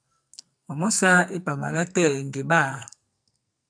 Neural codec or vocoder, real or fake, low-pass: codec, 44.1 kHz, 2.6 kbps, SNAC; fake; 9.9 kHz